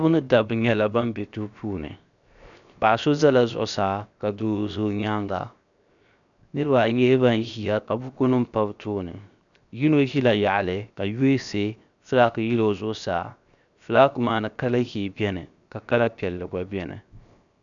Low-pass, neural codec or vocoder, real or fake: 7.2 kHz; codec, 16 kHz, 0.7 kbps, FocalCodec; fake